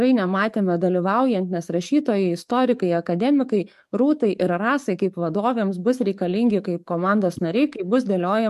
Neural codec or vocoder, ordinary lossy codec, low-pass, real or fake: codec, 44.1 kHz, 7.8 kbps, DAC; MP3, 64 kbps; 14.4 kHz; fake